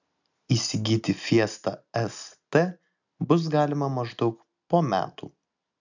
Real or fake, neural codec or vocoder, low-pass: real; none; 7.2 kHz